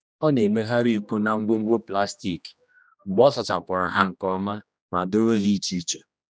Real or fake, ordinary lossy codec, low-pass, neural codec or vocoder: fake; none; none; codec, 16 kHz, 1 kbps, X-Codec, HuBERT features, trained on general audio